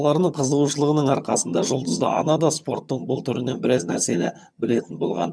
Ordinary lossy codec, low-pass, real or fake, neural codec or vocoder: none; none; fake; vocoder, 22.05 kHz, 80 mel bands, HiFi-GAN